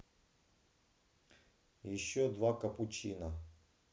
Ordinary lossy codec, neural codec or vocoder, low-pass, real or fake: none; none; none; real